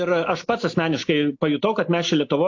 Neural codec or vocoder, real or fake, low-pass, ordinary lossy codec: none; real; 7.2 kHz; AAC, 48 kbps